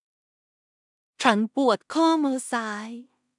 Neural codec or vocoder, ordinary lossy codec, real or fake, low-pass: codec, 16 kHz in and 24 kHz out, 0.4 kbps, LongCat-Audio-Codec, two codebook decoder; none; fake; 10.8 kHz